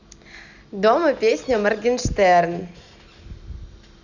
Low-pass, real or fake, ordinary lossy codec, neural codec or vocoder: 7.2 kHz; real; none; none